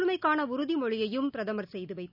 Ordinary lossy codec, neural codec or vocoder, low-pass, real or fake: none; none; 5.4 kHz; real